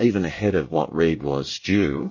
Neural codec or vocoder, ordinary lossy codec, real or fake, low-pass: codec, 24 kHz, 1 kbps, SNAC; MP3, 32 kbps; fake; 7.2 kHz